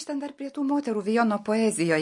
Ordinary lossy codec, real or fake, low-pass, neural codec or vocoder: MP3, 48 kbps; real; 10.8 kHz; none